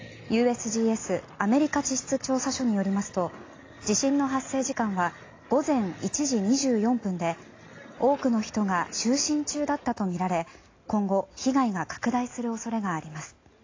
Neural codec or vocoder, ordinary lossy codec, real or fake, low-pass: none; AAC, 32 kbps; real; 7.2 kHz